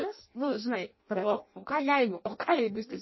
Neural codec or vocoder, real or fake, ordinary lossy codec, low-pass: codec, 16 kHz in and 24 kHz out, 0.6 kbps, FireRedTTS-2 codec; fake; MP3, 24 kbps; 7.2 kHz